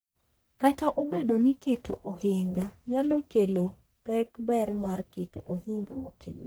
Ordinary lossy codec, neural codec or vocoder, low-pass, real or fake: none; codec, 44.1 kHz, 1.7 kbps, Pupu-Codec; none; fake